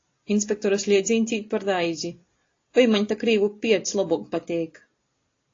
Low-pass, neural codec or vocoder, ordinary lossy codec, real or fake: 7.2 kHz; none; AAC, 32 kbps; real